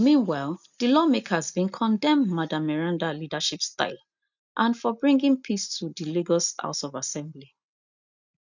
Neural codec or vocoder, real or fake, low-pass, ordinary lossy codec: none; real; 7.2 kHz; none